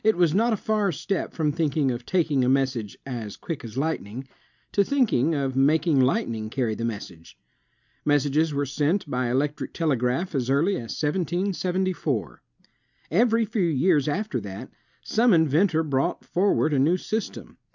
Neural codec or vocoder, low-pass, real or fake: none; 7.2 kHz; real